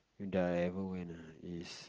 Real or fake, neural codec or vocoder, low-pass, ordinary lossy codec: real; none; 7.2 kHz; Opus, 16 kbps